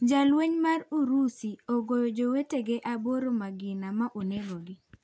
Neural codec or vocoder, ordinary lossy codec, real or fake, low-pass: none; none; real; none